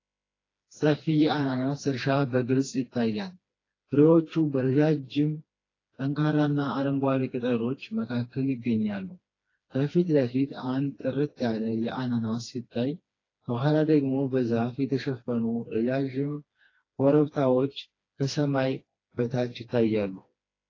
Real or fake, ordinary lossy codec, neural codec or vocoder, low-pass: fake; AAC, 32 kbps; codec, 16 kHz, 2 kbps, FreqCodec, smaller model; 7.2 kHz